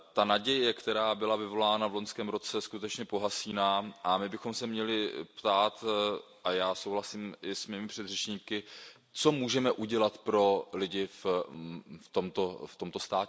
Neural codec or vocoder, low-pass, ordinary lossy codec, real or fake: none; none; none; real